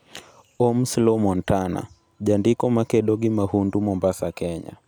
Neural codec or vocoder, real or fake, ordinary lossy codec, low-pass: none; real; none; none